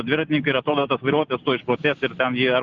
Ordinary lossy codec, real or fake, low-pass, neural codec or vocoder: Opus, 32 kbps; real; 7.2 kHz; none